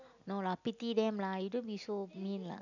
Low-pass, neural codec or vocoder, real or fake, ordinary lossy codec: 7.2 kHz; none; real; none